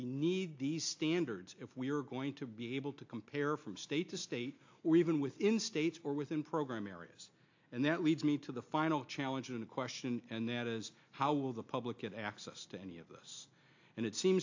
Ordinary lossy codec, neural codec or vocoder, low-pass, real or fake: AAC, 48 kbps; none; 7.2 kHz; real